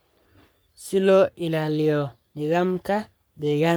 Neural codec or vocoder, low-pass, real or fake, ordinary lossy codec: codec, 44.1 kHz, 3.4 kbps, Pupu-Codec; none; fake; none